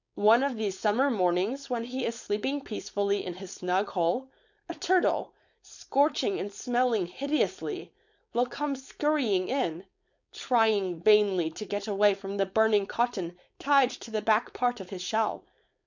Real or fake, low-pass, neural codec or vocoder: fake; 7.2 kHz; codec, 16 kHz, 4.8 kbps, FACodec